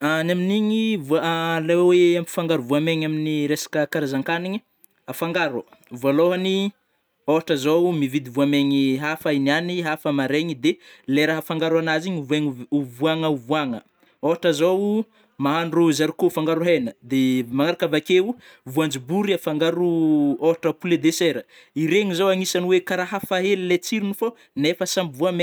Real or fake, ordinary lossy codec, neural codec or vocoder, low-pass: real; none; none; none